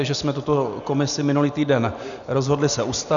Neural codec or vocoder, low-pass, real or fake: none; 7.2 kHz; real